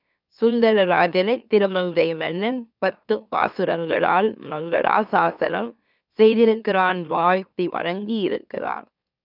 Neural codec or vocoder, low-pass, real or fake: autoencoder, 44.1 kHz, a latent of 192 numbers a frame, MeloTTS; 5.4 kHz; fake